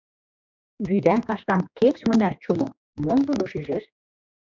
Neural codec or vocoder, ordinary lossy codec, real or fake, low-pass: codec, 16 kHz, 2 kbps, X-Codec, HuBERT features, trained on general audio; MP3, 64 kbps; fake; 7.2 kHz